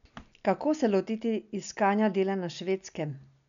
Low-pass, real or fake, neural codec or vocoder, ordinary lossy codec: 7.2 kHz; real; none; none